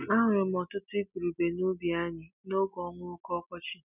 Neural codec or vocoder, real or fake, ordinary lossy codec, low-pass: none; real; none; 3.6 kHz